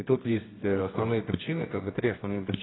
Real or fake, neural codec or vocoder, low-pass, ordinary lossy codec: fake; codec, 24 kHz, 0.9 kbps, WavTokenizer, medium music audio release; 7.2 kHz; AAC, 16 kbps